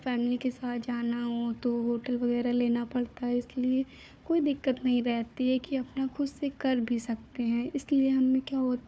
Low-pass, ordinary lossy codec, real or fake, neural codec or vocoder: none; none; fake; codec, 16 kHz, 4 kbps, FunCodec, trained on Chinese and English, 50 frames a second